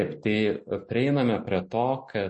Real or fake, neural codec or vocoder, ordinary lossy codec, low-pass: real; none; MP3, 32 kbps; 10.8 kHz